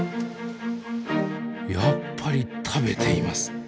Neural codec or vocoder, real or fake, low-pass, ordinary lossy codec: none; real; none; none